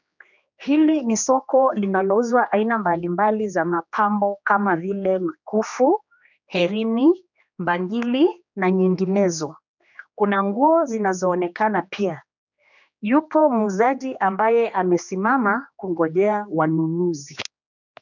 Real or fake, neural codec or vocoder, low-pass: fake; codec, 16 kHz, 2 kbps, X-Codec, HuBERT features, trained on general audio; 7.2 kHz